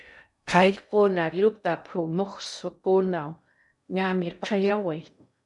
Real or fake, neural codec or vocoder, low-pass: fake; codec, 16 kHz in and 24 kHz out, 0.6 kbps, FocalCodec, streaming, 2048 codes; 10.8 kHz